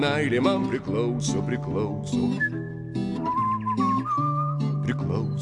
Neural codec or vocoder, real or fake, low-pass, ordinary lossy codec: none; real; 10.8 kHz; AAC, 64 kbps